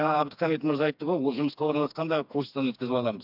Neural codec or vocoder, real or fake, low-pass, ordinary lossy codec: codec, 16 kHz, 2 kbps, FreqCodec, smaller model; fake; 5.4 kHz; AAC, 48 kbps